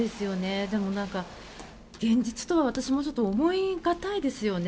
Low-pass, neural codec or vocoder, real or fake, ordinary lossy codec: none; none; real; none